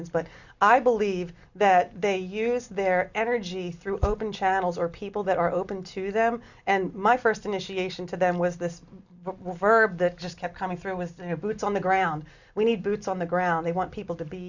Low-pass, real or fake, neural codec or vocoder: 7.2 kHz; real; none